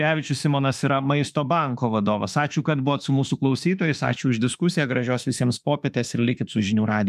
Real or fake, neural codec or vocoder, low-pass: fake; autoencoder, 48 kHz, 32 numbers a frame, DAC-VAE, trained on Japanese speech; 14.4 kHz